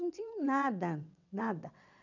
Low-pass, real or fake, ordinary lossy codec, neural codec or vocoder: 7.2 kHz; fake; none; vocoder, 22.05 kHz, 80 mel bands, WaveNeXt